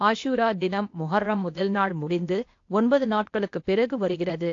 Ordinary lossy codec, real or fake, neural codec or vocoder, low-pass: AAC, 48 kbps; fake; codec, 16 kHz, 0.8 kbps, ZipCodec; 7.2 kHz